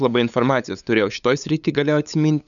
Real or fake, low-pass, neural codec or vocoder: fake; 7.2 kHz; codec, 16 kHz, 8 kbps, FunCodec, trained on LibriTTS, 25 frames a second